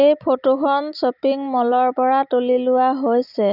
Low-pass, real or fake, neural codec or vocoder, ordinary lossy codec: 5.4 kHz; real; none; none